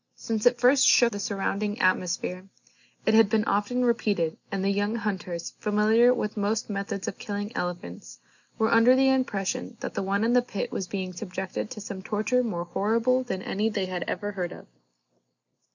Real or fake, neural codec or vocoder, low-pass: real; none; 7.2 kHz